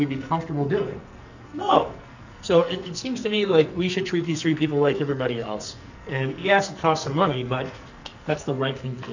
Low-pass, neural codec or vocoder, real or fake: 7.2 kHz; codec, 44.1 kHz, 2.6 kbps, SNAC; fake